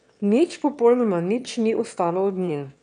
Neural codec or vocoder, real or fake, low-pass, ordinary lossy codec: autoencoder, 22.05 kHz, a latent of 192 numbers a frame, VITS, trained on one speaker; fake; 9.9 kHz; none